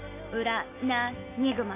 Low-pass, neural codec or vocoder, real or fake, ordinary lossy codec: 3.6 kHz; none; real; AAC, 24 kbps